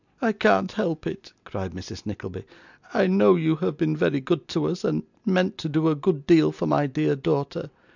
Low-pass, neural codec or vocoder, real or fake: 7.2 kHz; none; real